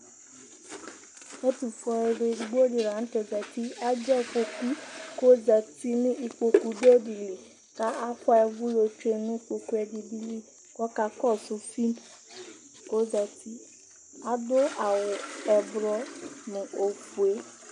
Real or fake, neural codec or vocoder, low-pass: real; none; 10.8 kHz